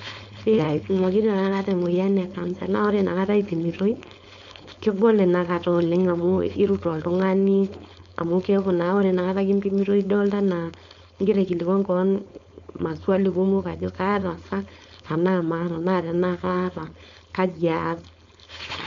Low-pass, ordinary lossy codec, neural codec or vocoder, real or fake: 7.2 kHz; MP3, 64 kbps; codec, 16 kHz, 4.8 kbps, FACodec; fake